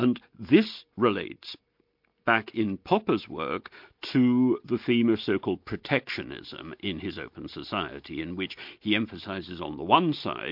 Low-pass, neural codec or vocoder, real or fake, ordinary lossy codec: 5.4 kHz; none; real; MP3, 48 kbps